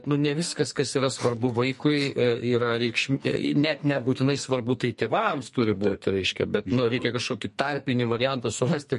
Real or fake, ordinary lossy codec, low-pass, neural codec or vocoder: fake; MP3, 48 kbps; 14.4 kHz; codec, 44.1 kHz, 2.6 kbps, SNAC